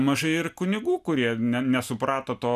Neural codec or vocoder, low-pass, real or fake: none; 14.4 kHz; real